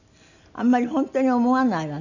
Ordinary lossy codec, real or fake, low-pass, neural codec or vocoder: none; real; 7.2 kHz; none